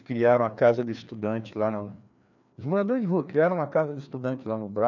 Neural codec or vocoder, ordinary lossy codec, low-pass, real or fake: codec, 16 kHz, 2 kbps, FreqCodec, larger model; none; 7.2 kHz; fake